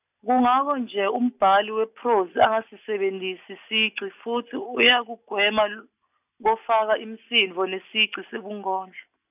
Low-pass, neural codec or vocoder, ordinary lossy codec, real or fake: 3.6 kHz; none; none; real